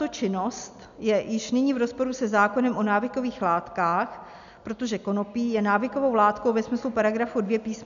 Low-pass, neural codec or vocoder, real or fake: 7.2 kHz; none; real